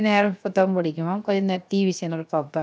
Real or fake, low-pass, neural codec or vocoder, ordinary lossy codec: fake; none; codec, 16 kHz, 0.7 kbps, FocalCodec; none